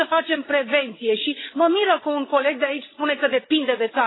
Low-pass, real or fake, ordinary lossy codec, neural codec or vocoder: 7.2 kHz; fake; AAC, 16 kbps; codec, 16 kHz, 16 kbps, FreqCodec, larger model